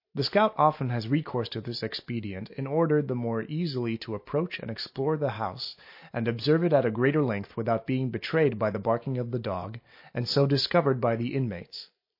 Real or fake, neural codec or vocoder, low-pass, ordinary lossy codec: real; none; 5.4 kHz; MP3, 32 kbps